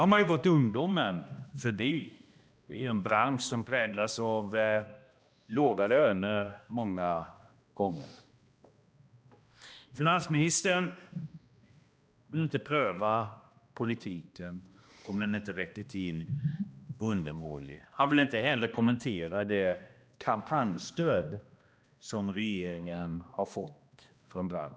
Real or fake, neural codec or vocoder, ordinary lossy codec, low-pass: fake; codec, 16 kHz, 1 kbps, X-Codec, HuBERT features, trained on balanced general audio; none; none